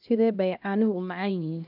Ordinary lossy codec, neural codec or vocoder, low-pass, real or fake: none; codec, 16 kHz, 1 kbps, X-Codec, HuBERT features, trained on LibriSpeech; 5.4 kHz; fake